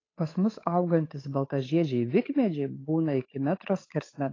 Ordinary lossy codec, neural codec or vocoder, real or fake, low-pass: AAC, 32 kbps; codec, 16 kHz, 8 kbps, FunCodec, trained on Chinese and English, 25 frames a second; fake; 7.2 kHz